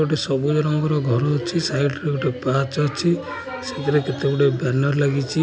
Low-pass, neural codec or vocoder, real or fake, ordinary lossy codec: none; none; real; none